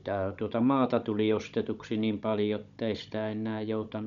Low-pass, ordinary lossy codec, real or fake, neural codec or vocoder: 7.2 kHz; none; fake; codec, 16 kHz, 16 kbps, FunCodec, trained on Chinese and English, 50 frames a second